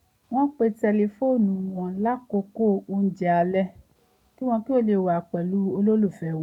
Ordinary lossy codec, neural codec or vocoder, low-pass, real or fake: none; none; 19.8 kHz; real